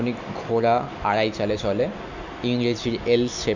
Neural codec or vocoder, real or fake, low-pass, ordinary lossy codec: none; real; 7.2 kHz; none